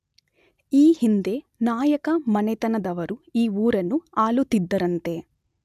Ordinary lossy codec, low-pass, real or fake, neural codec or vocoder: none; 14.4 kHz; real; none